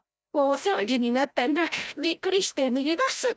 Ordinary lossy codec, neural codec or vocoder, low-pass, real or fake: none; codec, 16 kHz, 0.5 kbps, FreqCodec, larger model; none; fake